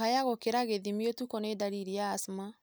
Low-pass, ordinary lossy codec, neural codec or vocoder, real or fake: none; none; none; real